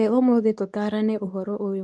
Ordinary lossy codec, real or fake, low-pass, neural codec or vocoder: none; fake; none; codec, 24 kHz, 0.9 kbps, WavTokenizer, medium speech release version 2